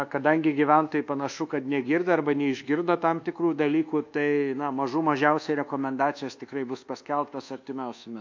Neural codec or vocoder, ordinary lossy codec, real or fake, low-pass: codec, 24 kHz, 1.2 kbps, DualCodec; MP3, 48 kbps; fake; 7.2 kHz